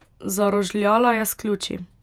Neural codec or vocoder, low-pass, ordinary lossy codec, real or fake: vocoder, 48 kHz, 128 mel bands, Vocos; 19.8 kHz; none; fake